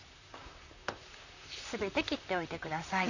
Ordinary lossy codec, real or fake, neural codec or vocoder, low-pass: none; fake; vocoder, 44.1 kHz, 128 mel bands, Pupu-Vocoder; 7.2 kHz